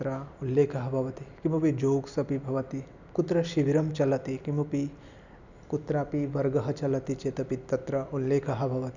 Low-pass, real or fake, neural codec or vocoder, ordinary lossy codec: 7.2 kHz; real; none; none